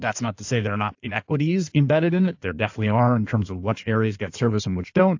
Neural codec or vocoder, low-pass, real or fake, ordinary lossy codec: codec, 16 kHz in and 24 kHz out, 1.1 kbps, FireRedTTS-2 codec; 7.2 kHz; fake; AAC, 48 kbps